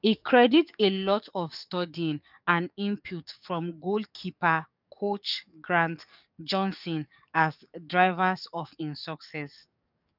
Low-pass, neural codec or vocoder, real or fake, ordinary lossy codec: 5.4 kHz; codec, 44.1 kHz, 7.8 kbps, DAC; fake; none